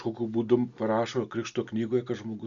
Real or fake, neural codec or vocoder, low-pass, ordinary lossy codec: real; none; 7.2 kHz; Opus, 64 kbps